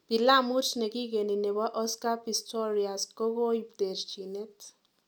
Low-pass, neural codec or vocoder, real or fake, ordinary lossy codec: 19.8 kHz; none; real; none